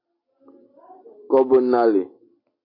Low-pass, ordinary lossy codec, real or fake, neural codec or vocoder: 5.4 kHz; MP3, 32 kbps; real; none